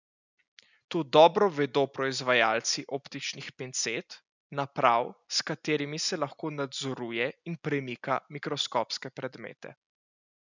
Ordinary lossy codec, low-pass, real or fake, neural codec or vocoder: none; 7.2 kHz; real; none